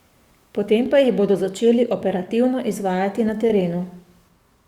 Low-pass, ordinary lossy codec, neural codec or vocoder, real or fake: 19.8 kHz; Opus, 64 kbps; codec, 44.1 kHz, 7.8 kbps, DAC; fake